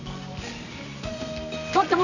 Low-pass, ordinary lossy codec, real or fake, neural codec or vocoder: 7.2 kHz; none; fake; codec, 24 kHz, 0.9 kbps, WavTokenizer, medium music audio release